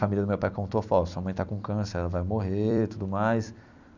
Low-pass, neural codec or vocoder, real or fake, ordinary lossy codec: 7.2 kHz; vocoder, 44.1 kHz, 128 mel bands every 256 samples, BigVGAN v2; fake; none